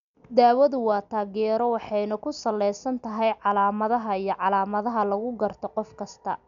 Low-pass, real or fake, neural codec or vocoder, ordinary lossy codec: 7.2 kHz; real; none; none